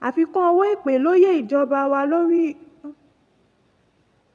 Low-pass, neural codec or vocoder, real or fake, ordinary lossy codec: none; vocoder, 22.05 kHz, 80 mel bands, WaveNeXt; fake; none